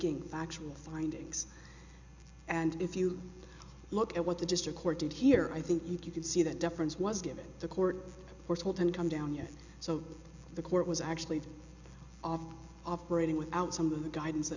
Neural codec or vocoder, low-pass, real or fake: none; 7.2 kHz; real